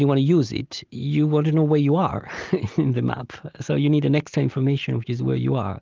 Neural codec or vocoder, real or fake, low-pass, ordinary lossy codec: none; real; 7.2 kHz; Opus, 32 kbps